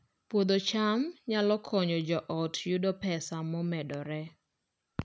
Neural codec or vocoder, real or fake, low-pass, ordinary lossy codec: none; real; none; none